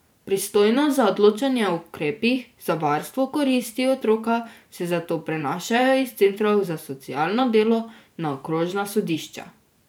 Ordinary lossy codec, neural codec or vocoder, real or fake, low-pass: none; vocoder, 44.1 kHz, 128 mel bands every 512 samples, BigVGAN v2; fake; none